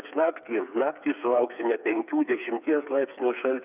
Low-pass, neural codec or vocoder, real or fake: 3.6 kHz; codec, 16 kHz, 4 kbps, FreqCodec, smaller model; fake